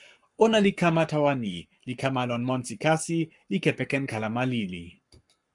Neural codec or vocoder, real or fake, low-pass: codec, 44.1 kHz, 7.8 kbps, Pupu-Codec; fake; 10.8 kHz